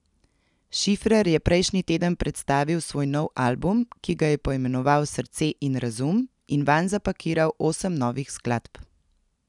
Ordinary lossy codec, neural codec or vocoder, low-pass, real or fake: none; none; 10.8 kHz; real